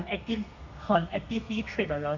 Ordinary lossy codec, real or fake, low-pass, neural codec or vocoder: none; fake; 7.2 kHz; codec, 32 kHz, 1.9 kbps, SNAC